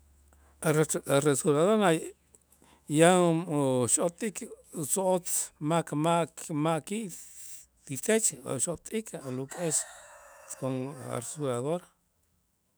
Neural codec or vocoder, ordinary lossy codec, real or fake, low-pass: autoencoder, 48 kHz, 128 numbers a frame, DAC-VAE, trained on Japanese speech; none; fake; none